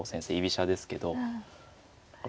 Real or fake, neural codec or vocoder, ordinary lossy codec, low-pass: real; none; none; none